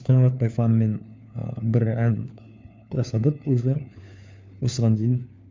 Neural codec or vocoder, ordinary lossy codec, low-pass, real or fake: codec, 16 kHz, 4 kbps, FunCodec, trained on LibriTTS, 50 frames a second; MP3, 64 kbps; 7.2 kHz; fake